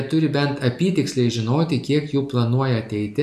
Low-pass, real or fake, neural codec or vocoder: 14.4 kHz; real; none